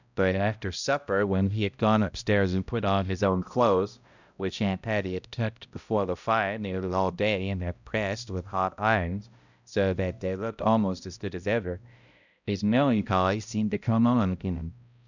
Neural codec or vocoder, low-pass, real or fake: codec, 16 kHz, 0.5 kbps, X-Codec, HuBERT features, trained on balanced general audio; 7.2 kHz; fake